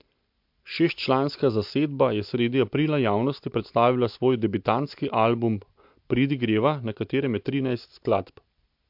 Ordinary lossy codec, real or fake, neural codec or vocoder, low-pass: MP3, 48 kbps; real; none; 5.4 kHz